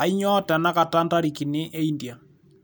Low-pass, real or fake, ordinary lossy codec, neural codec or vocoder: none; real; none; none